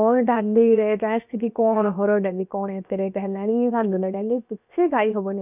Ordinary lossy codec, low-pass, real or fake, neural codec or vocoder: none; 3.6 kHz; fake; codec, 16 kHz, 0.7 kbps, FocalCodec